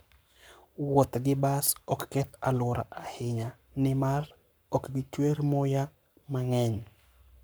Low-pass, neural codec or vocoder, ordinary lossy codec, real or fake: none; codec, 44.1 kHz, 7.8 kbps, Pupu-Codec; none; fake